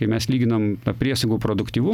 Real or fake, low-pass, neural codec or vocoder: real; 19.8 kHz; none